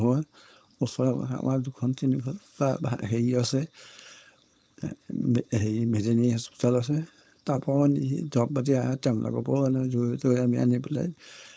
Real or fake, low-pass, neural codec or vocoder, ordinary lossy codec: fake; none; codec, 16 kHz, 4.8 kbps, FACodec; none